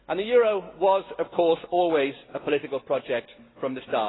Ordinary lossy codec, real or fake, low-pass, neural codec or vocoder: AAC, 16 kbps; real; 7.2 kHz; none